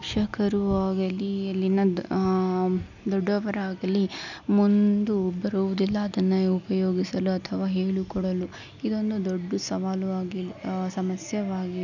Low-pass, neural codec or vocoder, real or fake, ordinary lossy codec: 7.2 kHz; none; real; none